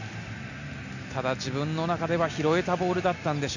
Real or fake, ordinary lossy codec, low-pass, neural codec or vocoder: real; none; 7.2 kHz; none